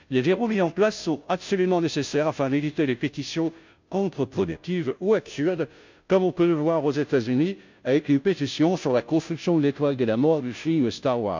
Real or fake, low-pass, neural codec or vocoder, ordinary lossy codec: fake; 7.2 kHz; codec, 16 kHz, 0.5 kbps, FunCodec, trained on Chinese and English, 25 frames a second; MP3, 64 kbps